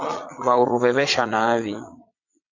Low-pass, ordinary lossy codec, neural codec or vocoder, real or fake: 7.2 kHz; AAC, 48 kbps; vocoder, 22.05 kHz, 80 mel bands, Vocos; fake